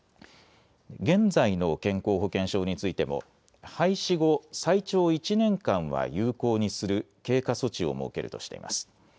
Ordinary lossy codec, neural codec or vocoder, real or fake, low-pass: none; none; real; none